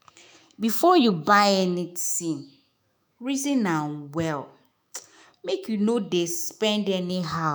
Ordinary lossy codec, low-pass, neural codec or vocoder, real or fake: none; none; autoencoder, 48 kHz, 128 numbers a frame, DAC-VAE, trained on Japanese speech; fake